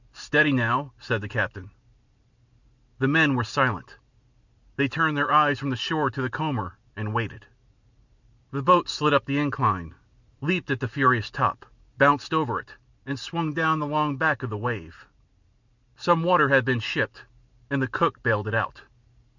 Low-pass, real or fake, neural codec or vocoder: 7.2 kHz; real; none